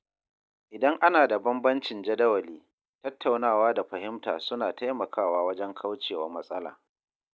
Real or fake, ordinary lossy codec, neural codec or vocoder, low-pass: real; none; none; none